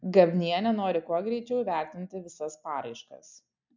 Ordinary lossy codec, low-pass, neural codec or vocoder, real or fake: MP3, 64 kbps; 7.2 kHz; none; real